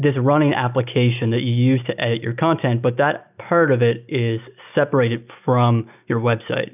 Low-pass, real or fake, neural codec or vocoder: 3.6 kHz; real; none